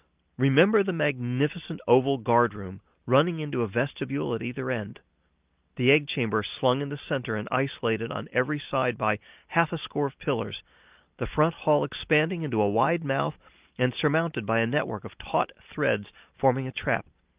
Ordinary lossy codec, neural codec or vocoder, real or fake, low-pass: Opus, 32 kbps; none; real; 3.6 kHz